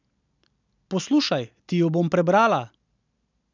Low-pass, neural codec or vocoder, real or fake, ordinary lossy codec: 7.2 kHz; none; real; none